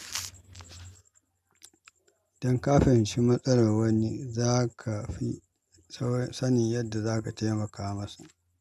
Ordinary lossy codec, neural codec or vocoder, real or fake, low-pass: MP3, 96 kbps; none; real; 14.4 kHz